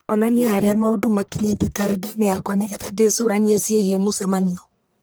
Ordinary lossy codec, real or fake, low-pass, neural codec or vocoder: none; fake; none; codec, 44.1 kHz, 1.7 kbps, Pupu-Codec